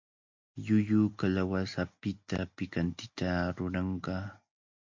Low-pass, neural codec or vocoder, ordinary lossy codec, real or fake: 7.2 kHz; none; MP3, 48 kbps; real